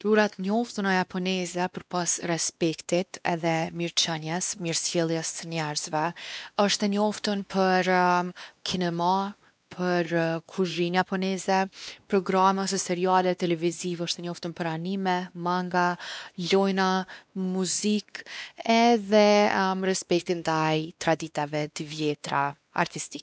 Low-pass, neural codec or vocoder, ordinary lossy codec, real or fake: none; codec, 16 kHz, 2 kbps, X-Codec, WavLM features, trained on Multilingual LibriSpeech; none; fake